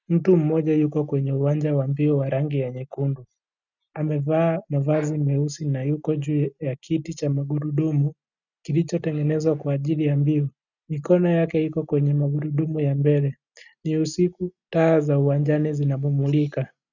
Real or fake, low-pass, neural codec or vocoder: real; 7.2 kHz; none